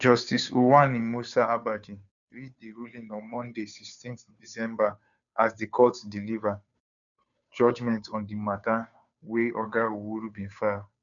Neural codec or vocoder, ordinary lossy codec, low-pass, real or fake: codec, 16 kHz, 2 kbps, FunCodec, trained on Chinese and English, 25 frames a second; none; 7.2 kHz; fake